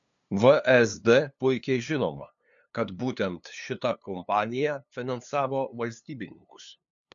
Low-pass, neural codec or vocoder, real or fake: 7.2 kHz; codec, 16 kHz, 2 kbps, FunCodec, trained on LibriTTS, 25 frames a second; fake